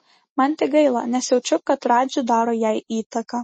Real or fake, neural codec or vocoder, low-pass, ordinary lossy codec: real; none; 10.8 kHz; MP3, 32 kbps